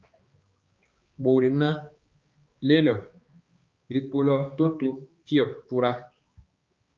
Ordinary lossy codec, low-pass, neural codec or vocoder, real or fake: Opus, 24 kbps; 7.2 kHz; codec, 16 kHz, 2 kbps, X-Codec, HuBERT features, trained on balanced general audio; fake